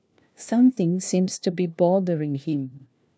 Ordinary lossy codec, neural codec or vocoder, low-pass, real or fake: none; codec, 16 kHz, 1 kbps, FunCodec, trained on LibriTTS, 50 frames a second; none; fake